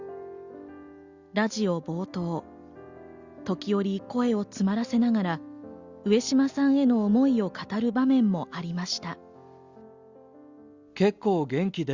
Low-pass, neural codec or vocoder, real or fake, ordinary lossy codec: 7.2 kHz; none; real; Opus, 64 kbps